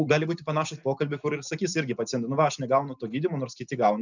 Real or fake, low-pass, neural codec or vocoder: real; 7.2 kHz; none